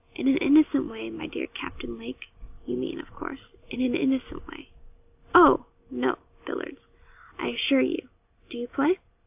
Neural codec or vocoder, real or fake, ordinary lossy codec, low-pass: none; real; AAC, 32 kbps; 3.6 kHz